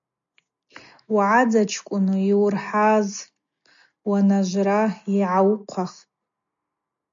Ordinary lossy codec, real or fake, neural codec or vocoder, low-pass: MP3, 64 kbps; real; none; 7.2 kHz